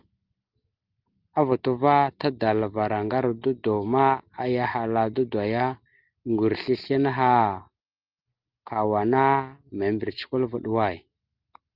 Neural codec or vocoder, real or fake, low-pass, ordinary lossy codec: none; real; 5.4 kHz; Opus, 24 kbps